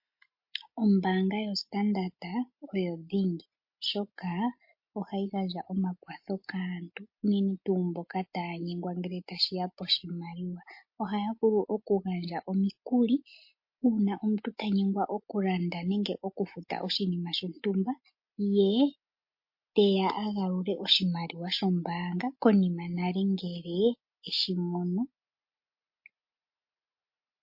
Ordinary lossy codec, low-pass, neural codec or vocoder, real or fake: MP3, 32 kbps; 5.4 kHz; none; real